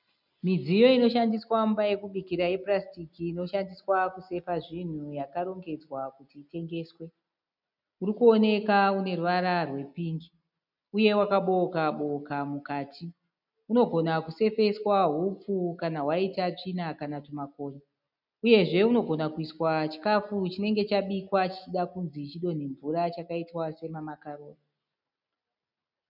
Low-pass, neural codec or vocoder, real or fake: 5.4 kHz; none; real